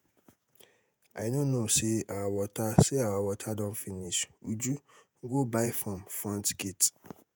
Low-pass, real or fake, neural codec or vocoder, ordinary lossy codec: none; fake; vocoder, 48 kHz, 128 mel bands, Vocos; none